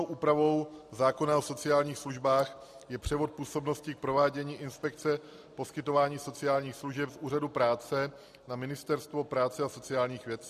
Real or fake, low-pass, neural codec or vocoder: real; 14.4 kHz; none